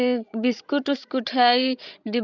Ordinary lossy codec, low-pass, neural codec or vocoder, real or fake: none; 7.2 kHz; codec, 16 kHz, 16 kbps, FreqCodec, larger model; fake